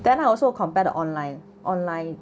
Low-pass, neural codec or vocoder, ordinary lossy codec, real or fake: none; none; none; real